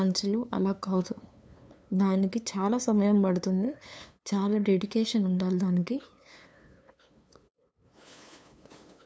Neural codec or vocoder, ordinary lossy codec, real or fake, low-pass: codec, 16 kHz, 2 kbps, FunCodec, trained on LibriTTS, 25 frames a second; none; fake; none